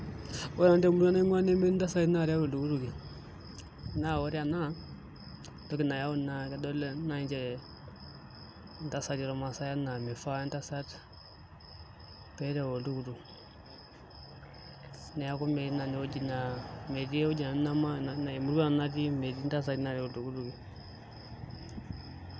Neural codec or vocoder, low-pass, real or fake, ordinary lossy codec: none; none; real; none